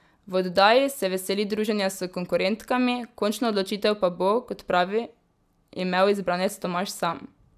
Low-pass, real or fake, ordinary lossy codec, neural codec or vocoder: 14.4 kHz; real; none; none